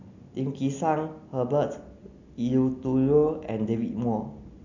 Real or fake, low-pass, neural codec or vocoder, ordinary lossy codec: real; 7.2 kHz; none; none